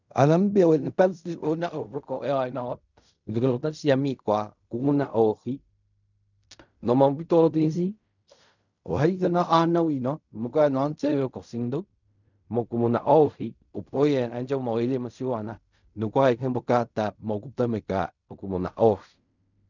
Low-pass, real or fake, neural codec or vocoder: 7.2 kHz; fake; codec, 16 kHz in and 24 kHz out, 0.4 kbps, LongCat-Audio-Codec, fine tuned four codebook decoder